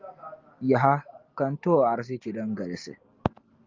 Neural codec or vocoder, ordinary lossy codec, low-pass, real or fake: none; Opus, 24 kbps; 7.2 kHz; real